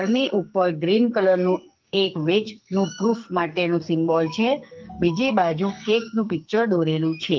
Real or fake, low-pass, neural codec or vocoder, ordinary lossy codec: fake; 7.2 kHz; codec, 44.1 kHz, 2.6 kbps, SNAC; Opus, 32 kbps